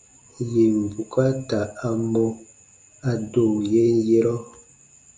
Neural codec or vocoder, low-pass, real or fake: none; 9.9 kHz; real